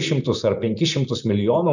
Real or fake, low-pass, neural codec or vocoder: real; 7.2 kHz; none